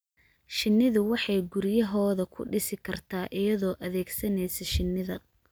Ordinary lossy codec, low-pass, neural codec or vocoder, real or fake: none; none; none; real